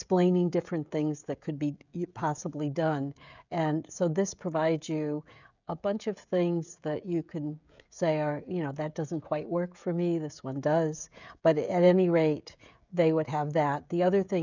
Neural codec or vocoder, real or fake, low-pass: codec, 16 kHz, 16 kbps, FreqCodec, smaller model; fake; 7.2 kHz